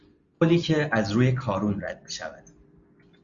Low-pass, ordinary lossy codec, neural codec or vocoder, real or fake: 7.2 kHz; Opus, 64 kbps; none; real